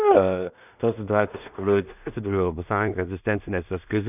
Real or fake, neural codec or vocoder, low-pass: fake; codec, 16 kHz in and 24 kHz out, 0.4 kbps, LongCat-Audio-Codec, two codebook decoder; 3.6 kHz